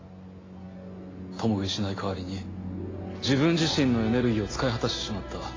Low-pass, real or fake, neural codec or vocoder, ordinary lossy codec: 7.2 kHz; real; none; AAC, 32 kbps